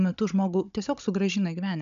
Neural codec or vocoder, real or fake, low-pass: codec, 16 kHz, 16 kbps, FunCodec, trained on Chinese and English, 50 frames a second; fake; 7.2 kHz